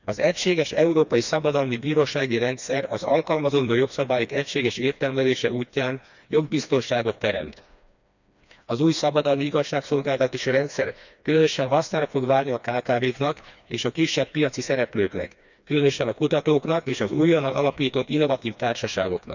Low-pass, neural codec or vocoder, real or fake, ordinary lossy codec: 7.2 kHz; codec, 16 kHz, 2 kbps, FreqCodec, smaller model; fake; none